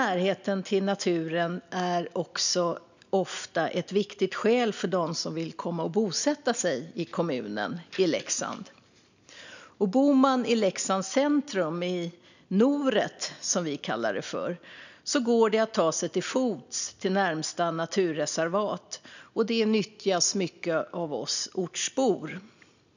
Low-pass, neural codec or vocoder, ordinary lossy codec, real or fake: 7.2 kHz; none; none; real